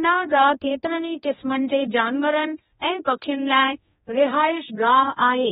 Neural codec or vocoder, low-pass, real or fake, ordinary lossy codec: codec, 16 kHz, 1 kbps, X-Codec, HuBERT features, trained on general audio; 7.2 kHz; fake; AAC, 16 kbps